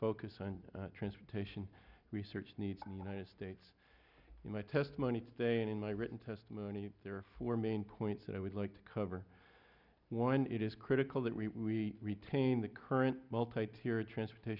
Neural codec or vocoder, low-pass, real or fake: none; 5.4 kHz; real